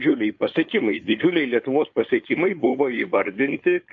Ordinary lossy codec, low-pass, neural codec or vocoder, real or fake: AAC, 48 kbps; 7.2 kHz; codec, 16 kHz, 4.8 kbps, FACodec; fake